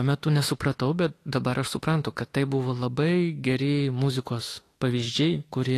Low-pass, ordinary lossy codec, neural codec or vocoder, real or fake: 14.4 kHz; AAC, 48 kbps; autoencoder, 48 kHz, 32 numbers a frame, DAC-VAE, trained on Japanese speech; fake